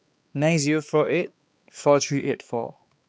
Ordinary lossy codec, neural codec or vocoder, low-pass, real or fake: none; codec, 16 kHz, 2 kbps, X-Codec, HuBERT features, trained on balanced general audio; none; fake